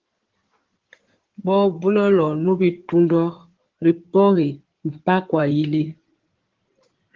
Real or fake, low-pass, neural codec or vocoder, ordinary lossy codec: fake; 7.2 kHz; codec, 16 kHz in and 24 kHz out, 2.2 kbps, FireRedTTS-2 codec; Opus, 16 kbps